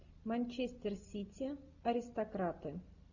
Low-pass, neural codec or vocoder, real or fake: 7.2 kHz; none; real